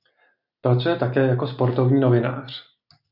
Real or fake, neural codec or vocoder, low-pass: real; none; 5.4 kHz